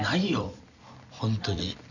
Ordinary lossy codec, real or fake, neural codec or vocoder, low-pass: none; fake; vocoder, 44.1 kHz, 128 mel bands every 256 samples, BigVGAN v2; 7.2 kHz